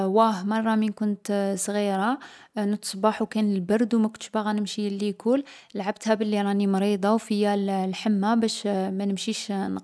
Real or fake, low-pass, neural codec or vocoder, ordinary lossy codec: real; none; none; none